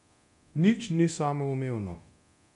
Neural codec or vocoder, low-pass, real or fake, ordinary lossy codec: codec, 24 kHz, 0.9 kbps, DualCodec; 10.8 kHz; fake; none